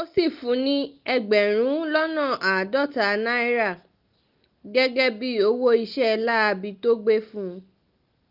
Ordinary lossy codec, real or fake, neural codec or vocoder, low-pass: Opus, 24 kbps; real; none; 5.4 kHz